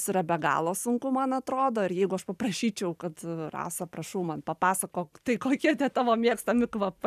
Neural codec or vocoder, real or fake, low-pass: vocoder, 44.1 kHz, 128 mel bands every 512 samples, BigVGAN v2; fake; 14.4 kHz